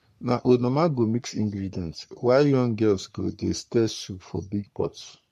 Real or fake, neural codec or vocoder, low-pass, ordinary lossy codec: fake; codec, 44.1 kHz, 3.4 kbps, Pupu-Codec; 14.4 kHz; MP3, 64 kbps